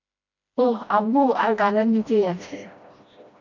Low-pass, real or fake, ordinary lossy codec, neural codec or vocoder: 7.2 kHz; fake; MP3, 64 kbps; codec, 16 kHz, 1 kbps, FreqCodec, smaller model